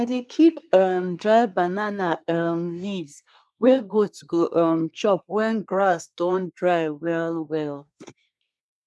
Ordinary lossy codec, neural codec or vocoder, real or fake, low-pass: none; codec, 24 kHz, 1 kbps, SNAC; fake; none